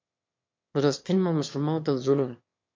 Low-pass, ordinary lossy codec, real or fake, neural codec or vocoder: 7.2 kHz; MP3, 48 kbps; fake; autoencoder, 22.05 kHz, a latent of 192 numbers a frame, VITS, trained on one speaker